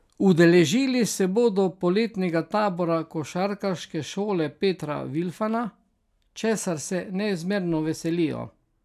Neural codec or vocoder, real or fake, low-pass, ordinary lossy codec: none; real; 14.4 kHz; none